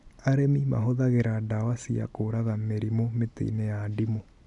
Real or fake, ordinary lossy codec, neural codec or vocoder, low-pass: real; none; none; 10.8 kHz